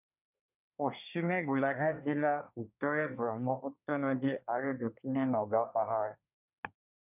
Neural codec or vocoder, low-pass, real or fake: codec, 24 kHz, 1 kbps, SNAC; 3.6 kHz; fake